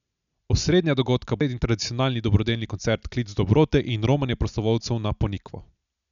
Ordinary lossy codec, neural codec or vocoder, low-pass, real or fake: none; none; 7.2 kHz; real